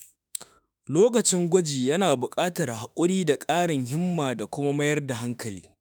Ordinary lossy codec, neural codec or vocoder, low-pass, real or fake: none; autoencoder, 48 kHz, 32 numbers a frame, DAC-VAE, trained on Japanese speech; none; fake